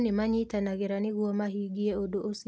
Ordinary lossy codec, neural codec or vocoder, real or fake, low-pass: none; none; real; none